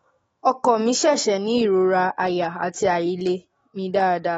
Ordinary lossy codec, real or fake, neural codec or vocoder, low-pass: AAC, 24 kbps; fake; autoencoder, 48 kHz, 128 numbers a frame, DAC-VAE, trained on Japanese speech; 19.8 kHz